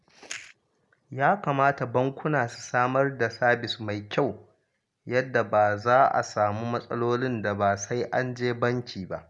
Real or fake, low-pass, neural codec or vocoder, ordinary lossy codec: real; 10.8 kHz; none; none